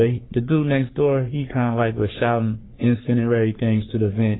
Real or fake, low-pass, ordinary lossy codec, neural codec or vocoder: fake; 7.2 kHz; AAC, 16 kbps; codec, 44.1 kHz, 3.4 kbps, Pupu-Codec